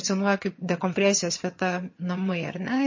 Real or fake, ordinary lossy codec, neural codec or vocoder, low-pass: fake; MP3, 32 kbps; vocoder, 22.05 kHz, 80 mel bands, HiFi-GAN; 7.2 kHz